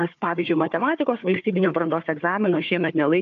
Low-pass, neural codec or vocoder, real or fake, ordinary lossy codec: 7.2 kHz; codec, 16 kHz, 4 kbps, FunCodec, trained on Chinese and English, 50 frames a second; fake; AAC, 64 kbps